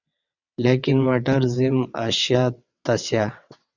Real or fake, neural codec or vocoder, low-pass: fake; vocoder, 22.05 kHz, 80 mel bands, WaveNeXt; 7.2 kHz